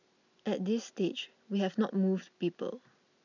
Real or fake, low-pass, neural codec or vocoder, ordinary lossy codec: real; 7.2 kHz; none; none